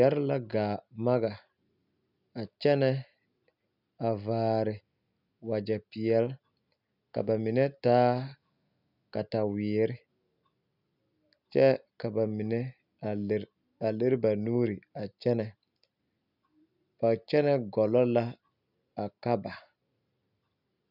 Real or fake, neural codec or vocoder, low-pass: real; none; 5.4 kHz